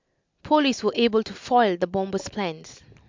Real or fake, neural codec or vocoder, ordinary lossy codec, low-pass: real; none; MP3, 64 kbps; 7.2 kHz